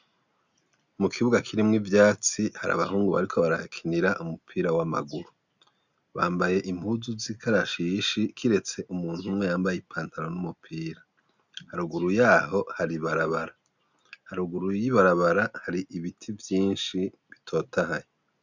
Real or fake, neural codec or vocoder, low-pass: real; none; 7.2 kHz